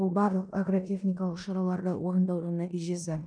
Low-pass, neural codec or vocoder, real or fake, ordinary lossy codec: 9.9 kHz; codec, 16 kHz in and 24 kHz out, 0.9 kbps, LongCat-Audio-Codec, four codebook decoder; fake; none